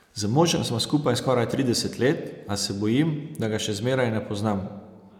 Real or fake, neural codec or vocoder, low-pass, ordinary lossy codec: real; none; 19.8 kHz; none